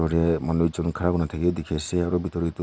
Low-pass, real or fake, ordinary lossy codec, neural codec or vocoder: none; real; none; none